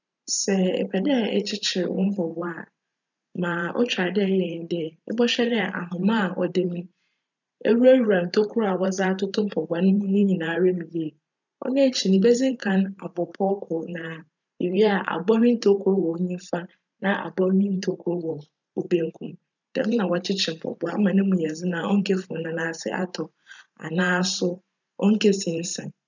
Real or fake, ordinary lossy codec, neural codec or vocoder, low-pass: fake; none; vocoder, 44.1 kHz, 128 mel bands every 512 samples, BigVGAN v2; 7.2 kHz